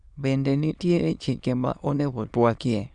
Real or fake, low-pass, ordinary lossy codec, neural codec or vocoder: fake; 9.9 kHz; Opus, 64 kbps; autoencoder, 22.05 kHz, a latent of 192 numbers a frame, VITS, trained on many speakers